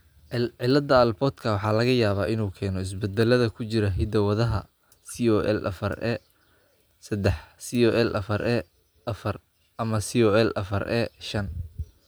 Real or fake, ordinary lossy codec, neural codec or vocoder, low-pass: real; none; none; none